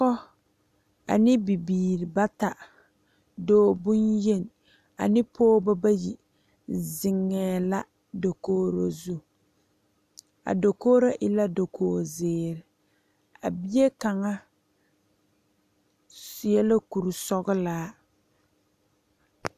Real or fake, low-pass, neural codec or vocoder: real; 14.4 kHz; none